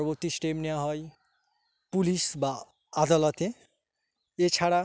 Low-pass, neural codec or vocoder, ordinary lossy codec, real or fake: none; none; none; real